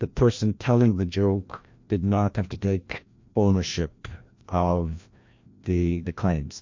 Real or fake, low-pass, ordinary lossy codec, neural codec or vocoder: fake; 7.2 kHz; MP3, 48 kbps; codec, 16 kHz, 1 kbps, FreqCodec, larger model